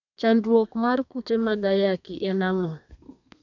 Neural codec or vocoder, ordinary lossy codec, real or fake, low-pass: codec, 32 kHz, 1.9 kbps, SNAC; none; fake; 7.2 kHz